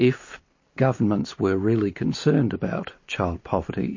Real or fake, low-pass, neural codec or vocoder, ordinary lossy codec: real; 7.2 kHz; none; MP3, 32 kbps